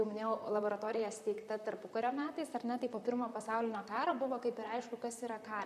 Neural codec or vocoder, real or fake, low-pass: vocoder, 44.1 kHz, 128 mel bands, Pupu-Vocoder; fake; 14.4 kHz